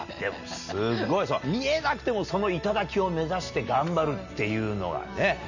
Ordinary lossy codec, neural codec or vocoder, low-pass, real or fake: none; none; 7.2 kHz; real